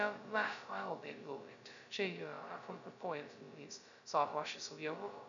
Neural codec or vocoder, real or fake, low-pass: codec, 16 kHz, 0.2 kbps, FocalCodec; fake; 7.2 kHz